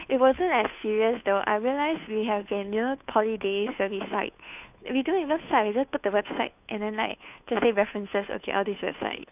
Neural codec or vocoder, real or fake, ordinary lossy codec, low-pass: codec, 16 kHz, 2 kbps, FunCodec, trained on Chinese and English, 25 frames a second; fake; none; 3.6 kHz